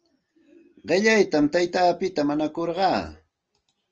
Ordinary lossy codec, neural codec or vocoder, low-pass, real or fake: Opus, 24 kbps; none; 7.2 kHz; real